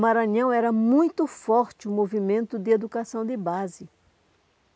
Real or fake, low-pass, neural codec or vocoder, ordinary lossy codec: real; none; none; none